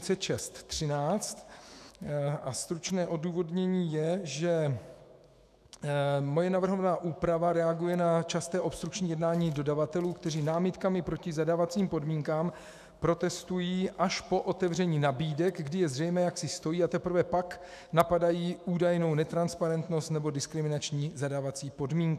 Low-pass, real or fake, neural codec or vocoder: 14.4 kHz; fake; autoencoder, 48 kHz, 128 numbers a frame, DAC-VAE, trained on Japanese speech